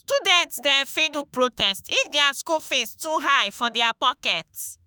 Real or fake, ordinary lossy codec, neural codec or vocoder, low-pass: fake; none; autoencoder, 48 kHz, 32 numbers a frame, DAC-VAE, trained on Japanese speech; none